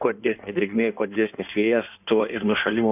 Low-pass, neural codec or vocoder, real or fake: 3.6 kHz; codec, 16 kHz in and 24 kHz out, 1.1 kbps, FireRedTTS-2 codec; fake